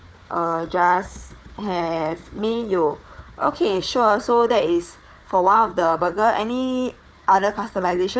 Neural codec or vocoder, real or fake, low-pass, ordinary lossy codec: codec, 16 kHz, 4 kbps, FunCodec, trained on Chinese and English, 50 frames a second; fake; none; none